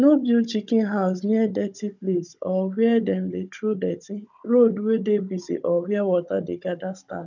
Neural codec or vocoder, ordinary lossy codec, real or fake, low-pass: codec, 16 kHz, 16 kbps, FunCodec, trained on Chinese and English, 50 frames a second; none; fake; 7.2 kHz